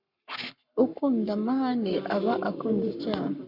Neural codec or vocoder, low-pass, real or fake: codec, 44.1 kHz, 7.8 kbps, Pupu-Codec; 5.4 kHz; fake